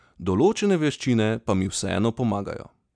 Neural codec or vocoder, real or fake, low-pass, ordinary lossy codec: none; real; 9.9 kHz; none